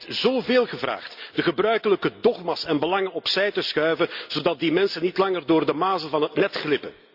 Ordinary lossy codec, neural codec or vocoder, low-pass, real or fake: Opus, 64 kbps; none; 5.4 kHz; real